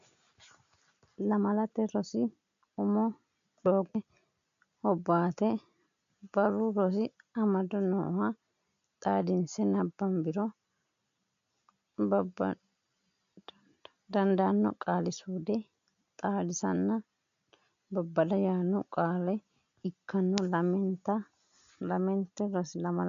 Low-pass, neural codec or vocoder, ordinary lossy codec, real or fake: 7.2 kHz; none; MP3, 64 kbps; real